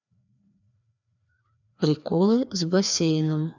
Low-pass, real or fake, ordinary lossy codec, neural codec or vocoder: 7.2 kHz; fake; none; codec, 16 kHz, 2 kbps, FreqCodec, larger model